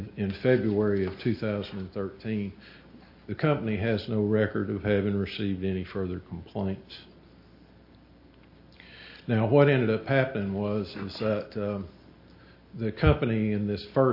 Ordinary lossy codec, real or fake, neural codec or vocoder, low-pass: MP3, 32 kbps; real; none; 5.4 kHz